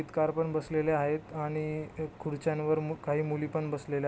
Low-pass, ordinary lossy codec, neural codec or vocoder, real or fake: none; none; none; real